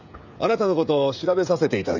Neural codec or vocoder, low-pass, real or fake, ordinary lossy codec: codec, 16 kHz, 16 kbps, FreqCodec, smaller model; 7.2 kHz; fake; none